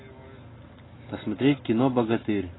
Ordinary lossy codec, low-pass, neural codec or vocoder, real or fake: AAC, 16 kbps; 7.2 kHz; none; real